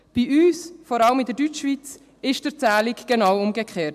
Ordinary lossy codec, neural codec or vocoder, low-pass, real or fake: MP3, 96 kbps; none; 14.4 kHz; real